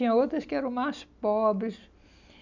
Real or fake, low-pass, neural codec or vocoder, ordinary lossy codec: real; 7.2 kHz; none; none